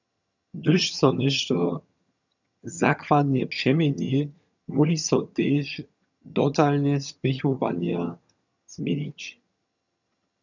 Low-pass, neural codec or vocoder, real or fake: 7.2 kHz; vocoder, 22.05 kHz, 80 mel bands, HiFi-GAN; fake